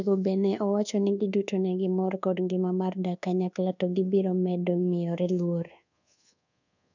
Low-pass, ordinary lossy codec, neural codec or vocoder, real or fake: 7.2 kHz; none; codec, 24 kHz, 1.2 kbps, DualCodec; fake